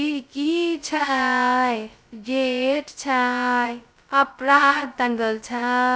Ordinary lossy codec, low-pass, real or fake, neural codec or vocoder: none; none; fake; codec, 16 kHz, 0.2 kbps, FocalCodec